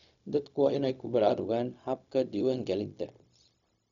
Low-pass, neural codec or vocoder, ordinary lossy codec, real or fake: 7.2 kHz; codec, 16 kHz, 0.4 kbps, LongCat-Audio-Codec; none; fake